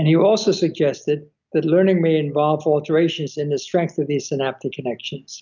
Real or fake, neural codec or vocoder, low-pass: real; none; 7.2 kHz